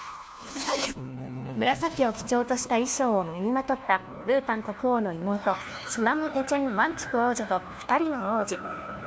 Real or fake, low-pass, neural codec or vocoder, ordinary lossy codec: fake; none; codec, 16 kHz, 1 kbps, FunCodec, trained on LibriTTS, 50 frames a second; none